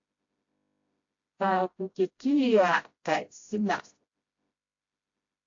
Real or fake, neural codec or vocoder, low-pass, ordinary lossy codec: fake; codec, 16 kHz, 0.5 kbps, FreqCodec, smaller model; 7.2 kHz; AAC, 48 kbps